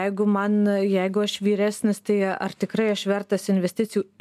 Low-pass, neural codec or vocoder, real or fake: 14.4 kHz; none; real